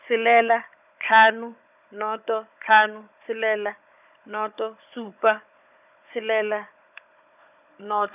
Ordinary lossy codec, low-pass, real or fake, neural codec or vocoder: none; 3.6 kHz; fake; codec, 16 kHz, 16 kbps, FunCodec, trained on Chinese and English, 50 frames a second